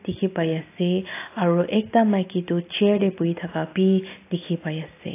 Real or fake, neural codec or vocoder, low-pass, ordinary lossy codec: real; none; 3.6 kHz; AAC, 24 kbps